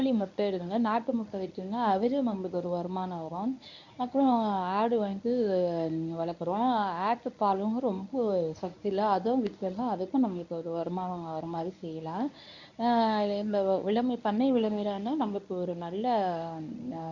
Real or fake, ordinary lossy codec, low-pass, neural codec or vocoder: fake; none; 7.2 kHz; codec, 24 kHz, 0.9 kbps, WavTokenizer, medium speech release version 1